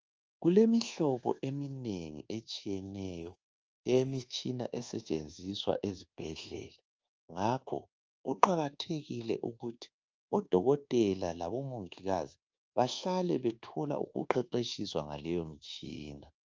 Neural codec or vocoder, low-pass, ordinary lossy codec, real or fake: codec, 24 kHz, 1.2 kbps, DualCodec; 7.2 kHz; Opus, 24 kbps; fake